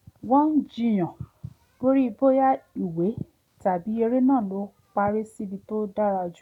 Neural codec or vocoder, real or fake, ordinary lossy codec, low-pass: none; real; none; 19.8 kHz